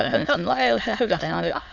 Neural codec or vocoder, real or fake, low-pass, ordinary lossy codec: autoencoder, 22.05 kHz, a latent of 192 numbers a frame, VITS, trained on many speakers; fake; 7.2 kHz; none